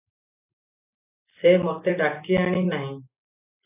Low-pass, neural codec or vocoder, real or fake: 3.6 kHz; none; real